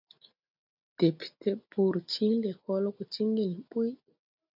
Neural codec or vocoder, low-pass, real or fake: none; 5.4 kHz; real